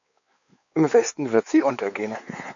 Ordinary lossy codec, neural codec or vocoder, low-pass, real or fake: AAC, 64 kbps; codec, 16 kHz, 4 kbps, X-Codec, WavLM features, trained on Multilingual LibriSpeech; 7.2 kHz; fake